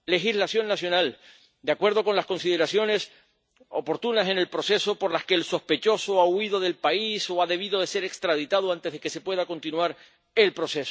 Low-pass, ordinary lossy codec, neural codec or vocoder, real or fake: none; none; none; real